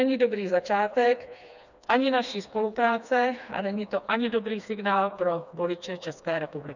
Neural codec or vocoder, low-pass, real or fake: codec, 16 kHz, 2 kbps, FreqCodec, smaller model; 7.2 kHz; fake